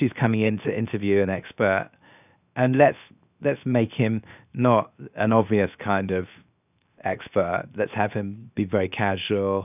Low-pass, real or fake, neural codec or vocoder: 3.6 kHz; fake; codec, 16 kHz, 0.7 kbps, FocalCodec